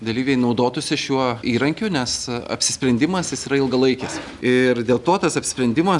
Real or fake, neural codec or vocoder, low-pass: real; none; 10.8 kHz